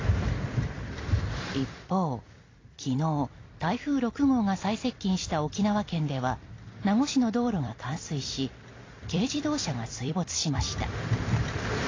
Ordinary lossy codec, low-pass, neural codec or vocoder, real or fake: AAC, 32 kbps; 7.2 kHz; vocoder, 44.1 kHz, 128 mel bands every 256 samples, BigVGAN v2; fake